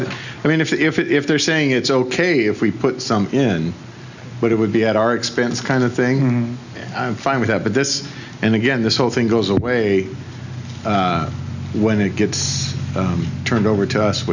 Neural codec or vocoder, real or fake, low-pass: none; real; 7.2 kHz